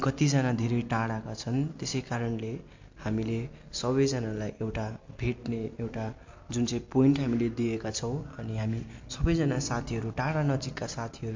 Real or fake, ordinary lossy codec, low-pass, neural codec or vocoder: real; MP3, 48 kbps; 7.2 kHz; none